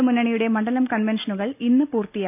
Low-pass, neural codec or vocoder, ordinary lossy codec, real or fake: 3.6 kHz; none; none; real